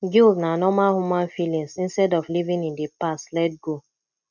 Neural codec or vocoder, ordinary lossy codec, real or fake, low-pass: none; none; real; 7.2 kHz